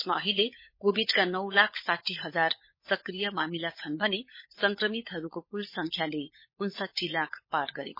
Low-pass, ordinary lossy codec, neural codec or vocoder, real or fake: 5.4 kHz; MP3, 24 kbps; codec, 16 kHz, 16 kbps, FunCodec, trained on LibriTTS, 50 frames a second; fake